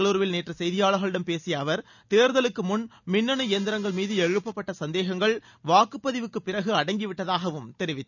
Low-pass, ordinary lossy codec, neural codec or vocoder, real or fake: 7.2 kHz; none; none; real